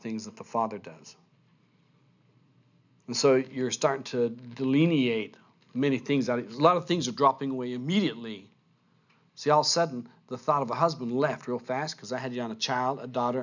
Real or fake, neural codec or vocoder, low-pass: real; none; 7.2 kHz